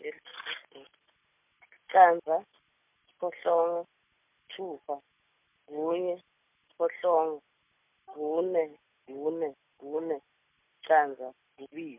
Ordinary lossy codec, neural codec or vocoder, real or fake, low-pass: MP3, 32 kbps; none; real; 3.6 kHz